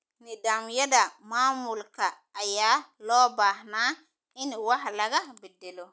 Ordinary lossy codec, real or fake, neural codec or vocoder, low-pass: none; real; none; none